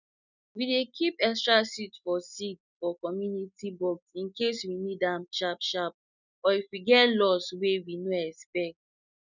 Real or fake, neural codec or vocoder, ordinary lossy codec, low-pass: real; none; none; 7.2 kHz